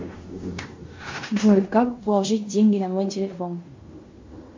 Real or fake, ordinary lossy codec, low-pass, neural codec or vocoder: fake; MP3, 48 kbps; 7.2 kHz; codec, 16 kHz in and 24 kHz out, 0.9 kbps, LongCat-Audio-Codec, fine tuned four codebook decoder